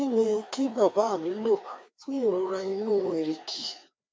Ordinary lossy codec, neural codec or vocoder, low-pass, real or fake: none; codec, 16 kHz, 2 kbps, FreqCodec, larger model; none; fake